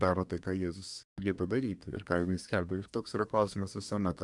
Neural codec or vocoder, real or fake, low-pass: codec, 24 kHz, 1 kbps, SNAC; fake; 10.8 kHz